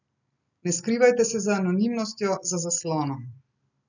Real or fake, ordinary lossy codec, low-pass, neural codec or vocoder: real; none; 7.2 kHz; none